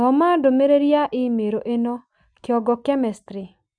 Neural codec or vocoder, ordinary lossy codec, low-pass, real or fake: none; none; 9.9 kHz; real